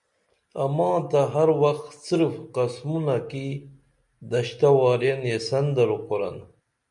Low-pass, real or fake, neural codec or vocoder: 10.8 kHz; real; none